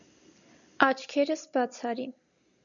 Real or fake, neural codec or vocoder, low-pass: real; none; 7.2 kHz